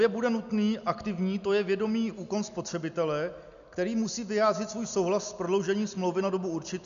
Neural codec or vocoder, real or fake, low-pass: none; real; 7.2 kHz